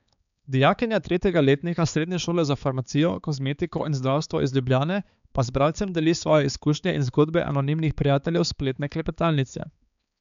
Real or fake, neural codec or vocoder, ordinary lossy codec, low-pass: fake; codec, 16 kHz, 4 kbps, X-Codec, HuBERT features, trained on balanced general audio; none; 7.2 kHz